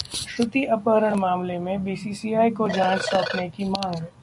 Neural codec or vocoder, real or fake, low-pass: none; real; 10.8 kHz